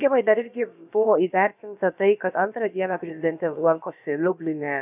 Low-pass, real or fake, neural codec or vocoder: 3.6 kHz; fake; codec, 16 kHz, about 1 kbps, DyCAST, with the encoder's durations